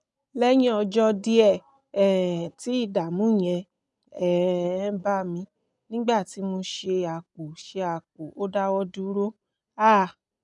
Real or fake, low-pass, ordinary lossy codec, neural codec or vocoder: real; 10.8 kHz; none; none